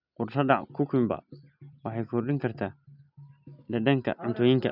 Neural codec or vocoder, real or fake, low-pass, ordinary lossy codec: none; real; 5.4 kHz; none